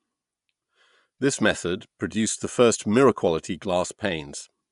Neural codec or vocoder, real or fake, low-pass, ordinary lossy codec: vocoder, 24 kHz, 100 mel bands, Vocos; fake; 10.8 kHz; none